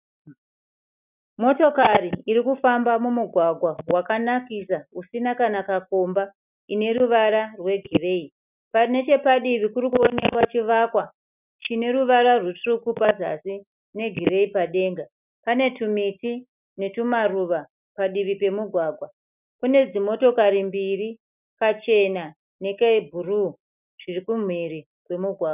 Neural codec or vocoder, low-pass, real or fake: none; 3.6 kHz; real